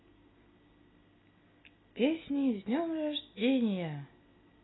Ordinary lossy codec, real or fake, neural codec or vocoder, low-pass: AAC, 16 kbps; real; none; 7.2 kHz